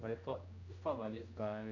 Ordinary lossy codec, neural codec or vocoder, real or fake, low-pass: none; codec, 16 kHz, 1 kbps, X-Codec, HuBERT features, trained on general audio; fake; 7.2 kHz